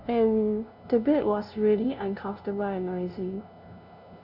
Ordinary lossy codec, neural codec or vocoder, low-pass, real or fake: none; codec, 16 kHz, 0.5 kbps, FunCodec, trained on LibriTTS, 25 frames a second; 5.4 kHz; fake